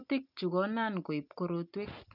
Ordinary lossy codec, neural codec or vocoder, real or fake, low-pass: none; none; real; 5.4 kHz